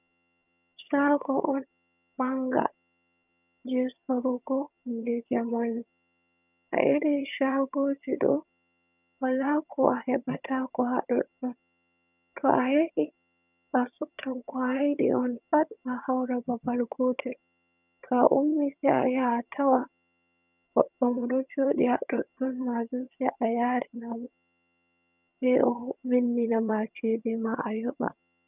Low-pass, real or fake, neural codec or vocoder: 3.6 kHz; fake; vocoder, 22.05 kHz, 80 mel bands, HiFi-GAN